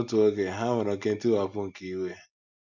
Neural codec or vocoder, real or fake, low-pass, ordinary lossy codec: none; real; 7.2 kHz; none